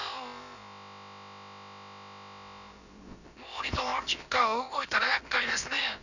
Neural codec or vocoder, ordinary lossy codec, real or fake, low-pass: codec, 16 kHz, about 1 kbps, DyCAST, with the encoder's durations; none; fake; 7.2 kHz